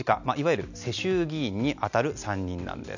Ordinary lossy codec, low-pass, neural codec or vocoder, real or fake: none; 7.2 kHz; none; real